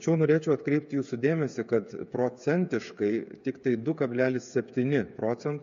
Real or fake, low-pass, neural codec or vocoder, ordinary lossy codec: fake; 7.2 kHz; codec, 16 kHz, 8 kbps, FreqCodec, smaller model; MP3, 48 kbps